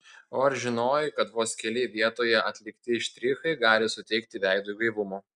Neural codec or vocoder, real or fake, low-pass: none; real; 10.8 kHz